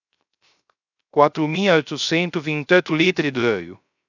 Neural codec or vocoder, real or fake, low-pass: codec, 16 kHz, 0.3 kbps, FocalCodec; fake; 7.2 kHz